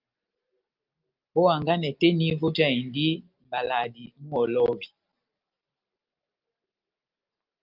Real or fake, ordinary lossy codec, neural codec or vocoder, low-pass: real; Opus, 24 kbps; none; 5.4 kHz